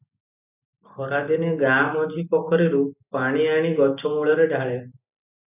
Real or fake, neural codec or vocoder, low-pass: real; none; 3.6 kHz